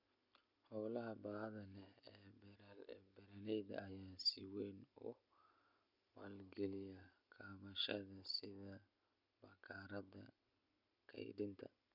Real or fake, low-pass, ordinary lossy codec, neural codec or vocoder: real; 5.4 kHz; none; none